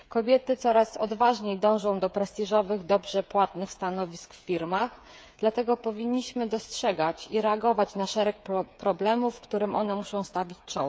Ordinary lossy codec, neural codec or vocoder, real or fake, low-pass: none; codec, 16 kHz, 8 kbps, FreqCodec, smaller model; fake; none